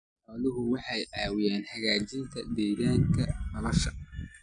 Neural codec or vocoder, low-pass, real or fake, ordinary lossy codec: none; 9.9 kHz; real; none